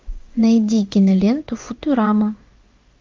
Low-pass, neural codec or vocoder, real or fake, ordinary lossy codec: 7.2 kHz; codec, 16 kHz in and 24 kHz out, 1 kbps, XY-Tokenizer; fake; Opus, 32 kbps